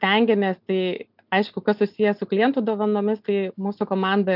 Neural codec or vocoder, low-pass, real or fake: none; 5.4 kHz; real